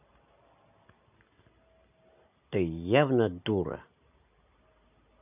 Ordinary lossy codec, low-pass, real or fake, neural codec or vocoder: none; 3.6 kHz; real; none